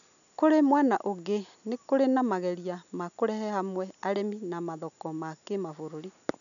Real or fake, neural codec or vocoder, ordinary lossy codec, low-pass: real; none; none; 7.2 kHz